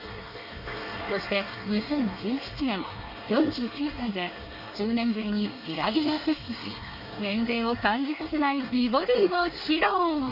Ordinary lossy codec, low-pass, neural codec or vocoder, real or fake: none; 5.4 kHz; codec, 24 kHz, 1 kbps, SNAC; fake